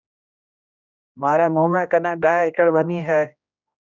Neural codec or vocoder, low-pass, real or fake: codec, 16 kHz, 1 kbps, X-Codec, HuBERT features, trained on general audio; 7.2 kHz; fake